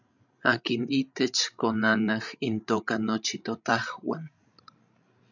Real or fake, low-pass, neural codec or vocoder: fake; 7.2 kHz; codec, 16 kHz, 16 kbps, FreqCodec, larger model